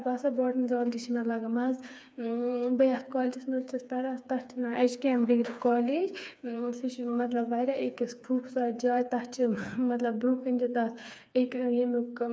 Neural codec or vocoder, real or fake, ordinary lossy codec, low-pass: codec, 16 kHz, 4 kbps, FreqCodec, smaller model; fake; none; none